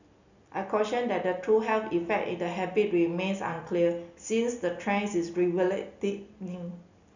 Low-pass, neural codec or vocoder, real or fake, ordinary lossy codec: 7.2 kHz; none; real; none